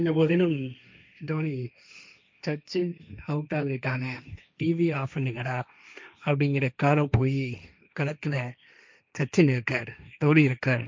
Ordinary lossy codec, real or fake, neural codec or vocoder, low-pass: none; fake; codec, 16 kHz, 1.1 kbps, Voila-Tokenizer; none